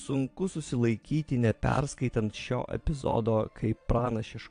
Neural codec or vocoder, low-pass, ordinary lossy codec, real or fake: vocoder, 22.05 kHz, 80 mel bands, Vocos; 9.9 kHz; AAC, 64 kbps; fake